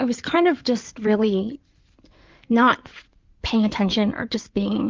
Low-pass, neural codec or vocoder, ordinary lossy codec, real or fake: 7.2 kHz; codec, 16 kHz in and 24 kHz out, 2.2 kbps, FireRedTTS-2 codec; Opus, 32 kbps; fake